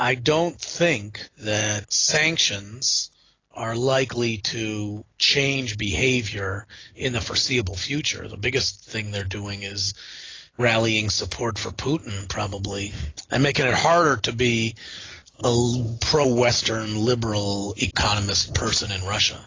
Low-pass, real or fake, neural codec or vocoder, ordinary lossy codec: 7.2 kHz; real; none; AAC, 32 kbps